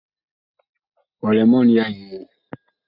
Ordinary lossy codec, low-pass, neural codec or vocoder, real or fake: Opus, 64 kbps; 5.4 kHz; none; real